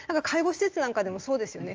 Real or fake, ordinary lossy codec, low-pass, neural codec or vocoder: real; Opus, 24 kbps; 7.2 kHz; none